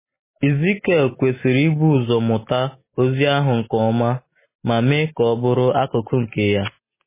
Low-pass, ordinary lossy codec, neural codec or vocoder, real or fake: 3.6 kHz; MP3, 16 kbps; none; real